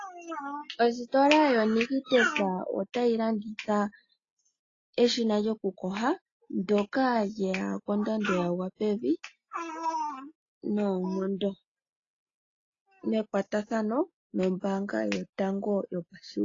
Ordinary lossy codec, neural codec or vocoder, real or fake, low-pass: AAC, 32 kbps; none; real; 7.2 kHz